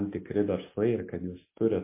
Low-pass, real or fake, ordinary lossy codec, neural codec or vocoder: 3.6 kHz; real; MP3, 24 kbps; none